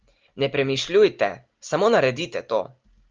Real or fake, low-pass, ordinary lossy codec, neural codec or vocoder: real; 7.2 kHz; Opus, 24 kbps; none